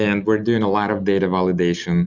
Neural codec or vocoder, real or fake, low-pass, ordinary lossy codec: none; real; 7.2 kHz; Opus, 64 kbps